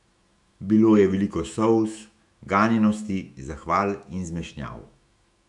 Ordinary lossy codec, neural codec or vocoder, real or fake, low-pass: none; autoencoder, 48 kHz, 128 numbers a frame, DAC-VAE, trained on Japanese speech; fake; 10.8 kHz